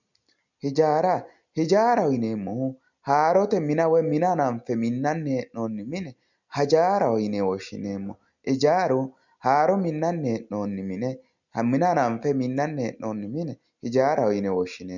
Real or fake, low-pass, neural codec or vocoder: real; 7.2 kHz; none